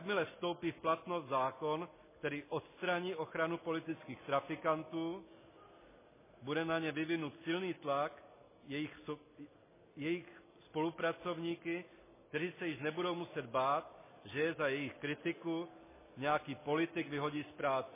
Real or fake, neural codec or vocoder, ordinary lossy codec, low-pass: real; none; MP3, 16 kbps; 3.6 kHz